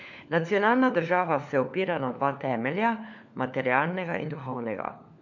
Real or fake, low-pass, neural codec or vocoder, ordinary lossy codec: fake; 7.2 kHz; codec, 16 kHz, 4 kbps, FunCodec, trained on LibriTTS, 50 frames a second; none